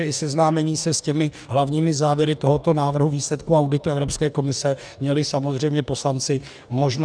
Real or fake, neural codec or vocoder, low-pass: fake; codec, 44.1 kHz, 2.6 kbps, DAC; 9.9 kHz